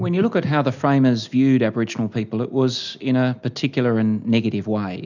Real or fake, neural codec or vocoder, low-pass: real; none; 7.2 kHz